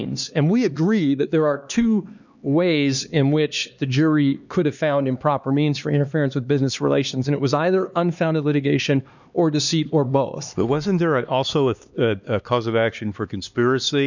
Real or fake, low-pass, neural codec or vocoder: fake; 7.2 kHz; codec, 16 kHz, 2 kbps, X-Codec, HuBERT features, trained on LibriSpeech